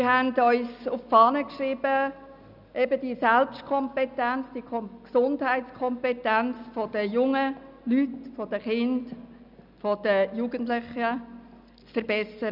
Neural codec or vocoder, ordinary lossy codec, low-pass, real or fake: none; none; 5.4 kHz; real